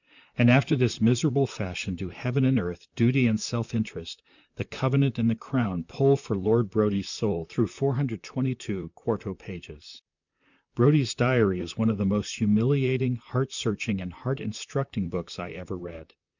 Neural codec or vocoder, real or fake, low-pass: vocoder, 44.1 kHz, 128 mel bands, Pupu-Vocoder; fake; 7.2 kHz